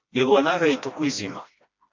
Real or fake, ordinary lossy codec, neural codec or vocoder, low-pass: fake; MP3, 48 kbps; codec, 16 kHz, 1 kbps, FreqCodec, smaller model; 7.2 kHz